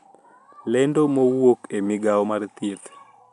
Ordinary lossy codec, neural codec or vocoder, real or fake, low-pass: none; none; real; 10.8 kHz